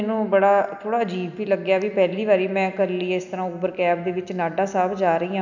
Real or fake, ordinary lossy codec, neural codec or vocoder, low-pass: real; none; none; 7.2 kHz